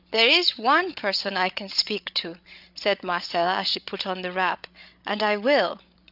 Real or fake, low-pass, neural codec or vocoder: fake; 5.4 kHz; codec, 16 kHz, 8 kbps, FreqCodec, larger model